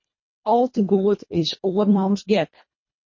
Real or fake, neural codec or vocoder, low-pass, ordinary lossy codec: fake; codec, 24 kHz, 1.5 kbps, HILCodec; 7.2 kHz; MP3, 32 kbps